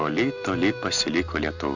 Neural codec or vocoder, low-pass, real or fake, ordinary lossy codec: none; 7.2 kHz; real; Opus, 64 kbps